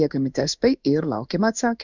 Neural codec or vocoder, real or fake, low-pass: codec, 16 kHz in and 24 kHz out, 1 kbps, XY-Tokenizer; fake; 7.2 kHz